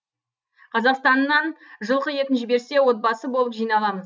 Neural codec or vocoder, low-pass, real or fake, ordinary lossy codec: none; none; real; none